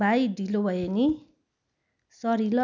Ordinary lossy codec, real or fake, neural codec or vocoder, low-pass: none; real; none; 7.2 kHz